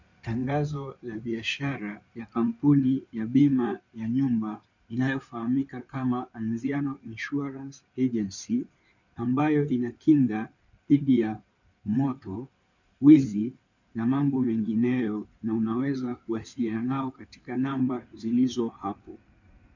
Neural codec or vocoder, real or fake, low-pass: codec, 16 kHz in and 24 kHz out, 2.2 kbps, FireRedTTS-2 codec; fake; 7.2 kHz